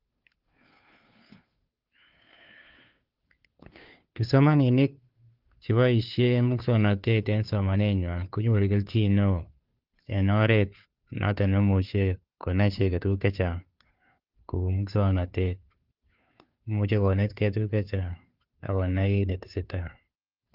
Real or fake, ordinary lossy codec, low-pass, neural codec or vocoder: fake; Opus, 32 kbps; 5.4 kHz; codec, 16 kHz, 2 kbps, FunCodec, trained on Chinese and English, 25 frames a second